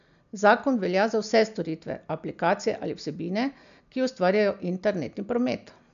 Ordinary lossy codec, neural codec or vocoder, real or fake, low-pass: none; none; real; 7.2 kHz